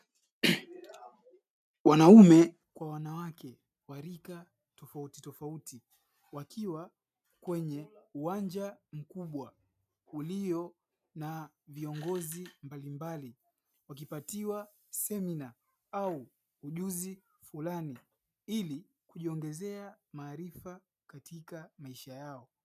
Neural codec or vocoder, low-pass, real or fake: none; 14.4 kHz; real